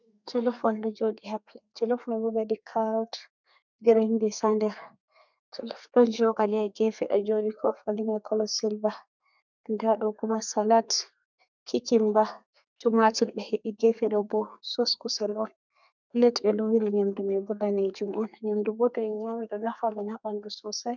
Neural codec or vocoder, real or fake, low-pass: codec, 32 kHz, 1.9 kbps, SNAC; fake; 7.2 kHz